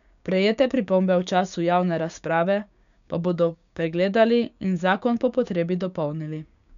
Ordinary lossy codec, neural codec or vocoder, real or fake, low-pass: none; codec, 16 kHz, 6 kbps, DAC; fake; 7.2 kHz